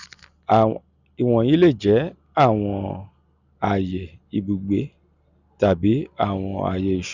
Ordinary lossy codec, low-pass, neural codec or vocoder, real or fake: none; 7.2 kHz; none; real